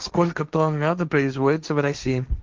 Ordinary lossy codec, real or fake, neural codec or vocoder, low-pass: Opus, 24 kbps; fake; codec, 16 kHz, 1.1 kbps, Voila-Tokenizer; 7.2 kHz